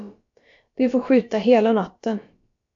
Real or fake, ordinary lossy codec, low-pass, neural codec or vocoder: fake; AAC, 48 kbps; 7.2 kHz; codec, 16 kHz, about 1 kbps, DyCAST, with the encoder's durations